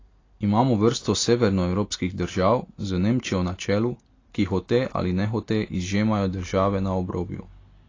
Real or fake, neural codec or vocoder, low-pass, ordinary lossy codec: real; none; 7.2 kHz; AAC, 32 kbps